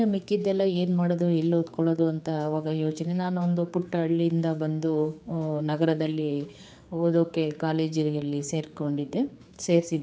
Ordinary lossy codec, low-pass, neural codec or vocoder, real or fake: none; none; codec, 16 kHz, 4 kbps, X-Codec, HuBERT features, trained on general audio; fake